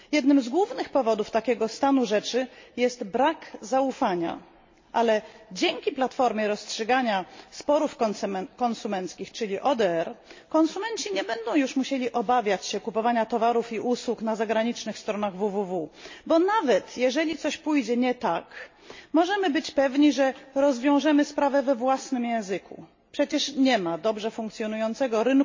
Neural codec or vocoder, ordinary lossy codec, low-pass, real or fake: none; MP3, 32 kbps; 7.2 kHz; real